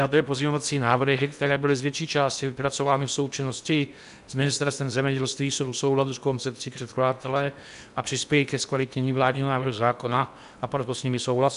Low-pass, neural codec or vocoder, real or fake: 10.8 kHz; codec, 16 kHz in and 24 kHz out, 0.8 kbps, FocalCodec, streaming, 65536 codes; fake